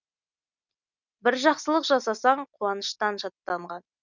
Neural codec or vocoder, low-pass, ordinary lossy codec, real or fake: none; 7.2 kHz; none; real